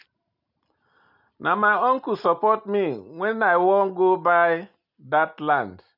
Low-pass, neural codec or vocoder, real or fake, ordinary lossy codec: 5.4 kHz; none; real; none